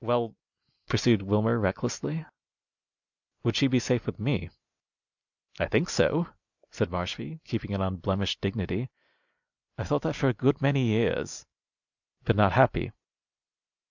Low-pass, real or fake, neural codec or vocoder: 7.2 kHz; real; none